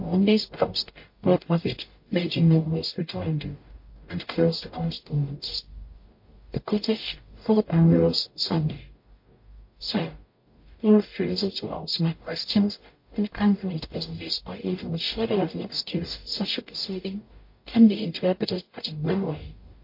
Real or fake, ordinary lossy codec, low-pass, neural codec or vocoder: fake; MP3, 32 kbps; 5.4 kHz; codec, 44.1 kHz, 0.9 kbps, DAC